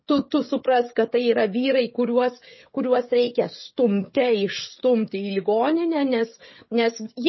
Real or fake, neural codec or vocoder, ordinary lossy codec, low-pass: fake; codec, 16 kHz, 16 kbps, FreqCodec, smaller model; MP3, 24 kbps; 7.2 kHz